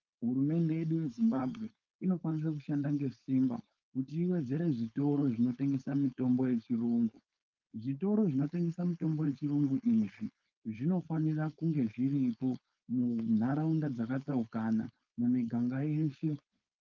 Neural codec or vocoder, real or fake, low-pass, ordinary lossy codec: codec, 16 kHz, 4.8 kbps, FACodec; fake; 7.2 kHz; Opus, 32 kbps